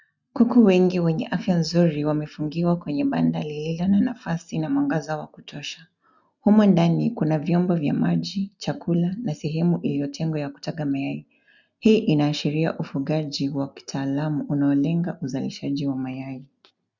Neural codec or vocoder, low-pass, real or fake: none; 7.2 kHz; real